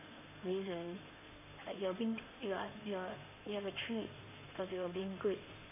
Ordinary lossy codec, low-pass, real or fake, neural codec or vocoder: none; 3.6 kHz; fake; codec, 16 kHz in and 24 kHz out, 2.2 kbps, FireRedTTS-2 codec